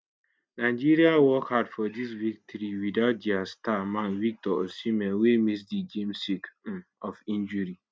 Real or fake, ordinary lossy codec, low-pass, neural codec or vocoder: real; none; none; none